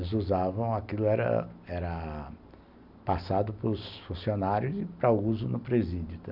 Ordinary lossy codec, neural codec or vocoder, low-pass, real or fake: none; none; 5.4 kHz; real